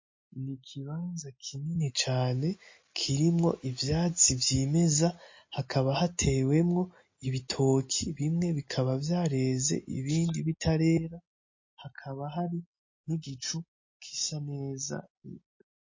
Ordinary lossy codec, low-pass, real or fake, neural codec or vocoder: MP3, 32 kbps; 7.2 kHz; real; none